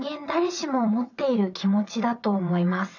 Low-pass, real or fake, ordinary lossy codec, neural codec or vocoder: 7.2 kHz; fake; none; vocoder, 22.05 kHz, 80 mel bands, WaveNeXt